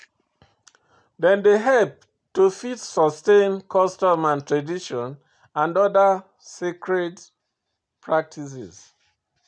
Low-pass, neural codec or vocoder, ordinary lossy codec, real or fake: 9.9 kHz; none; none; real